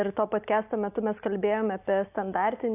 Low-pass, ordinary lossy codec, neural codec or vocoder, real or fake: 3.6 kHz; AAC, 32 kbps; none; real